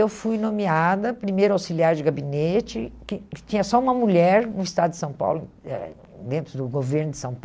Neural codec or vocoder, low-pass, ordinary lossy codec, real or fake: none; none; none; real